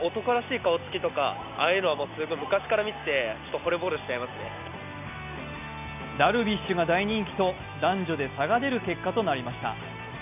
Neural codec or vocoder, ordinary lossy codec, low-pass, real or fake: none; none; 3.6 kHz; real